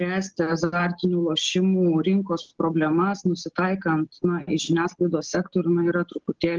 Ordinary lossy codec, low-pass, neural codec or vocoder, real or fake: Opus, 16 kbps; 7.2 kHz; none; real